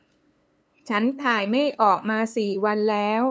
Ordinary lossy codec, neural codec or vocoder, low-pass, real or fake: none; codec, 16 kHz, 2 kbps, FunCodec, trained on LibriTTS, 25 frames a second; none; fake